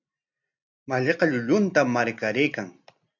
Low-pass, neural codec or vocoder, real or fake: 7.2 kHz; none; real